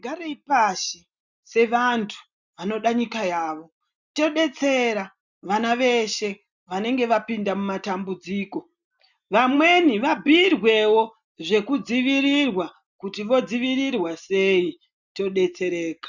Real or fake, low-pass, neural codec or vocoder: real; 7.2 kHz; none